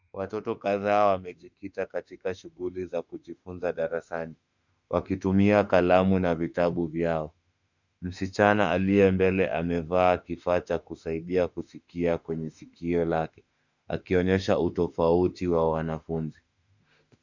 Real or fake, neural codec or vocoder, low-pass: fake; autoencoder, 48 kHz, 32 numbers a frame, DAC-VAE, trained on Japanese speech; 7.2 kHz